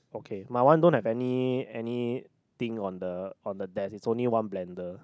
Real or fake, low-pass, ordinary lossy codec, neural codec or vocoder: real; none; none; none